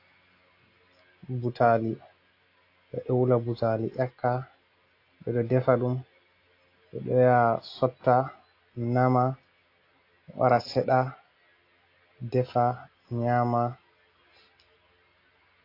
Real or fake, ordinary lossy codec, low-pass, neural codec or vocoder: real; AAC, 32 kbps; 5.4 kHz; none